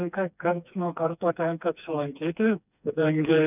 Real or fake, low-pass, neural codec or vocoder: fake; 3.6 kHz; codec, 16 kHz, 2 kbps, FreqCodec, smaller model